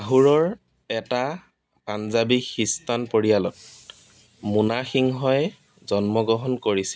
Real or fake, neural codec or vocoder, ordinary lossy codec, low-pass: real; none; none; none